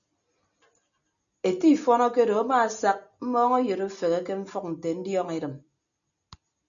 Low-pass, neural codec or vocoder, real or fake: 7.2 kHz; none; real